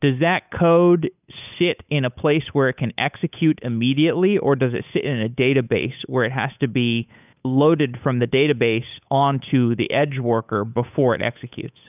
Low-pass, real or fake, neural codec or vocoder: 3.6 kHz; fake; codec, 16 kHz, 8 kbps, FunCodec, trained on Chinese and English, 25 frames a second